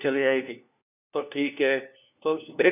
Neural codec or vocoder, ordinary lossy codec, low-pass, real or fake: codec, 16 kHz, 1 kbps, FunCodec, trained on LibriTTS, 50 frames a second; none; 3.6 kHz; fake